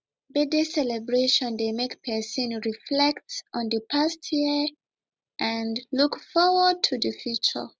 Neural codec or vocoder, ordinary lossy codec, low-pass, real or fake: none; none; none; real